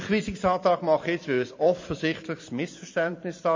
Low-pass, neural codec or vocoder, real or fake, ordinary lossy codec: 7.2 kHz; vocoder, 44.1 kHz, 128 mel bands every 256 samples, BigVGAN v2; fake; MP3, 32 kbps